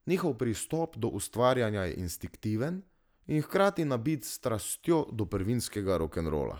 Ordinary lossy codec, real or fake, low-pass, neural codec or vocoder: none; real; none; none